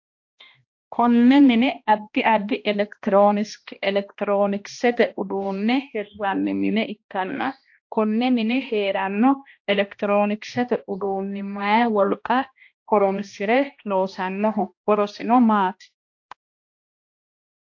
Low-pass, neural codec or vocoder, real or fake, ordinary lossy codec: 7.2 kHz; codec, 16 kHz, 1 kbps, X-Codec, HuBERT features, trained on balanced general audio; fake; AAC, 48 kbps